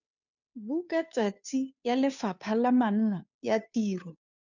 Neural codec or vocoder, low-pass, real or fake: codec, 16 kHz, 2 kbps, FunCodec, trained on Chinese and English, 25 frames a second; 7.2 kHz; fake